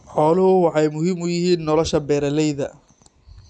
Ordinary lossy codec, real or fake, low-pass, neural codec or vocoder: none; real; none; none